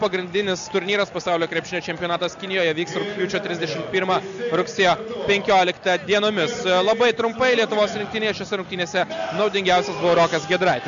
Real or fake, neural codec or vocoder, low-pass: real; none; 7.2 kHz